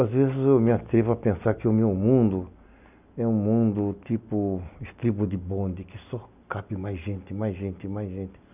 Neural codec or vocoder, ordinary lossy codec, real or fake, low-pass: none; none; real; 3.6 kHz